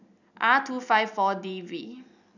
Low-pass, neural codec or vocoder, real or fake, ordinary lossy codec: 7.2 kHz; none; real; none